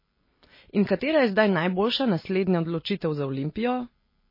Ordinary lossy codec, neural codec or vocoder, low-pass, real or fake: MP3, 24 kbps; none; 5.4 kHz; real